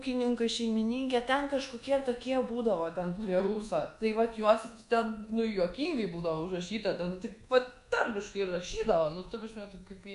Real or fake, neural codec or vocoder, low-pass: fake; codec, 24 kHz, 1.2 kbps, DualCodec; 10.8 kHz